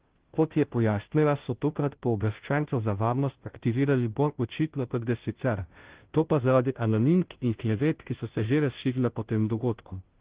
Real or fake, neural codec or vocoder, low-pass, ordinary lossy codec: fake; codec, 16 kHz, 0.5 kbps, FunCodec, trained on Chinese and English, 25 frames a second; 3.6 kHz; Opus, 16 kbps